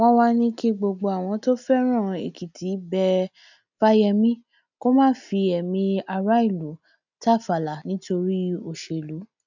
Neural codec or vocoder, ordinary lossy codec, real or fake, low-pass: none; none; real; 7.2 kHz